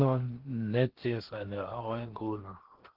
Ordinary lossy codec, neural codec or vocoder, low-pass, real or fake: Opus, 16 kbps; codec, 16 kHz in and 24 kHz out, 0.8 kbps, FocalCodec, streaming, 65536 codes; 5.4 kHz; fake